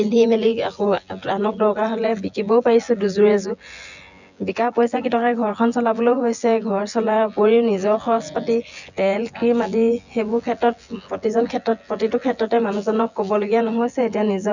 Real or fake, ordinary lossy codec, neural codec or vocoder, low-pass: fake; none; vocoder, 24 kHz, 100 mel bands, Vocos; 7.2 kHz